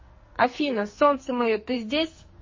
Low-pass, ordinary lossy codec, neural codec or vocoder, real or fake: 7.2 kHz; MP3, 32 kbps; codec, 44.1 kHz, 2.6 kbps, SNAC; fake